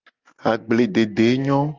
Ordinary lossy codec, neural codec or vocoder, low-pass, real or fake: Opus, 32 kbps; none; 7.2 kHz; real